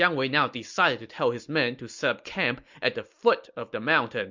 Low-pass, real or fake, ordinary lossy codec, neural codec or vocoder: 7.2 kHz; real; MP3, 64 kbps; none